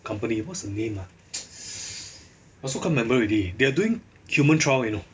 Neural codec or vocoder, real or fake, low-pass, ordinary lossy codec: none; real; none; none